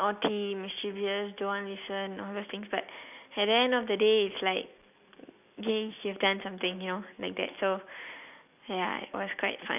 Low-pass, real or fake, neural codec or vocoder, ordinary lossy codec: 3.6 kHz; real; none; none